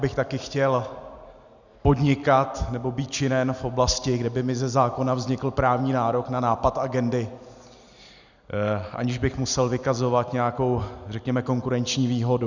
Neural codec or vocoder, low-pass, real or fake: none; 7.2 kHz; real